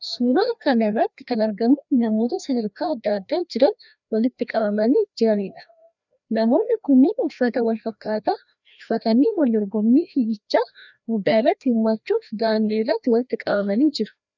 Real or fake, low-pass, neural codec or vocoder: fake; 7.2 kHz; codec, 16 kHz, 1 kbps, FreqCodec, larger model